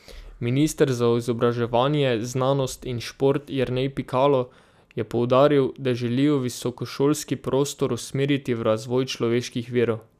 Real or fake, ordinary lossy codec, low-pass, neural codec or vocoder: real; none; 14.4 kHz; none